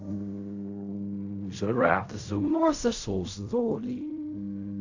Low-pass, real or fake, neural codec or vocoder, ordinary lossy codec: 7.2 kHz; fake; codec, 16 kHz in and 24 kHz out, 0.4 kbps, LongCat-Audio-Codec, fine tuned four codebook decoder; AAC, 48 kbps